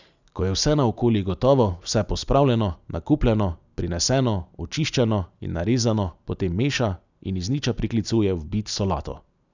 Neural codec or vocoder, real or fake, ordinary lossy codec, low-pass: none; real; none; 7.2 kHz